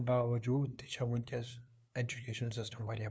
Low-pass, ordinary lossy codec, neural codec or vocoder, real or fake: none; none; codec, 16 kHz, 2 kbps, FunCodec, trained on LibriTTS, 25 frames a second; fake